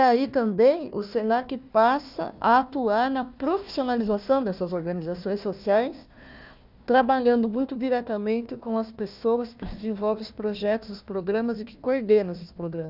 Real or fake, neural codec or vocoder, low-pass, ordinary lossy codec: fake; codec, 16 kHz, 1 kbps, FunCodec, trained on Chinese and English, 50 frames a second; 5.4 kHz; none